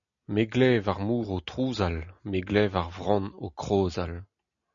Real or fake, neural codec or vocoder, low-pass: real; none; 7.2 kHz